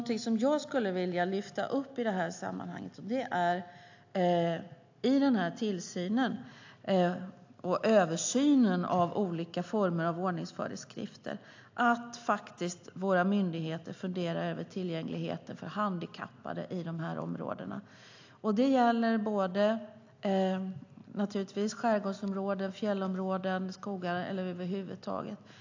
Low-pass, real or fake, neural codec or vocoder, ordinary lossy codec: 7.2 kHz; real; none; AAC, 48 kbps